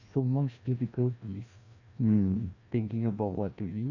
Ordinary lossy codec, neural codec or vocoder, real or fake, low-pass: AAC, 48 kbps; codec, 16 kHz, 1 kbps, FreqCodec, larger model; fake; 7.2 kHz